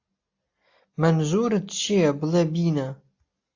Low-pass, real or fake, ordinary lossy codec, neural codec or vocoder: 7.2 kHz; real; AAC, 48 kbps; none